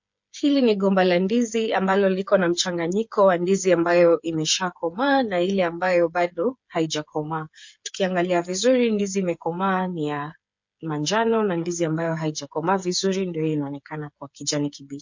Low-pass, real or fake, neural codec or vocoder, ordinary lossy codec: 7.2 kHz; fake; codec, 16 kHz, 8 kbps, FreqCodec, smaller model; MP3, 48 kbps